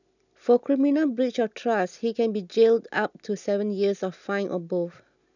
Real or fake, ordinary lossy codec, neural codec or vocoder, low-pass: real; none; none; 7.2 kHz